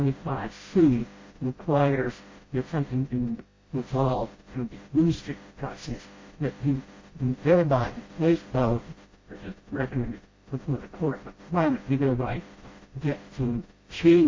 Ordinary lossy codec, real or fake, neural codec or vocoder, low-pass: MP3, 32 kbps; fake; codec, 16 kHz, 0.5 kbps, FreqCodec, smaller model; 7.2 kHz